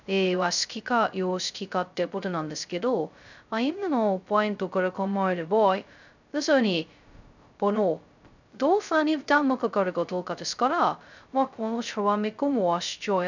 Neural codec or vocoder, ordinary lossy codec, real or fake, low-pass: codec, 16 kHz, 0.2 kbps, FocalCodec; none; fake; 7.2 kHz